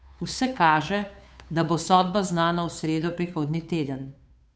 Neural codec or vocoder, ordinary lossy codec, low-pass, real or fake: codec, 16 kHz, 2 kbps, FunCodec, trained on Chinese and English, 25 frames a second; none; none; fake